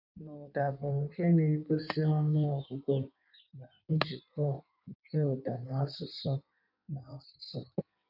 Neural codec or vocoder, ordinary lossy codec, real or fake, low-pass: codec, 16 kHz in and 24 kHz out, 1.1 kbps, FireRedTTS-2 codec; none; fake; 5.4 kHz